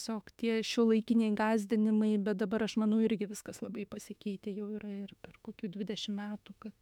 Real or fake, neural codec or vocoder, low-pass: fake; autoencoder, 48 kHz, 32 numbers a frame, DAC-VAE, trained on Japanese speech; 19.8 kHz